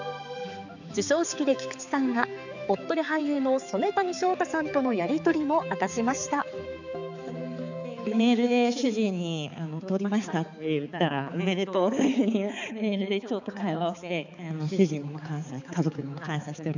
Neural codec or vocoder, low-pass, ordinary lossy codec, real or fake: codec, 16 kHz, 4 kbps, X-Codec, HuBERT features, trained on balanced general audio; 7.2 kHz; none; fake